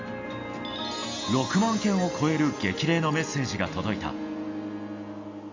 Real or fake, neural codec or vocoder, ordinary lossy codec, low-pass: real; none; AAC, 48 kbps; 7.2 kHz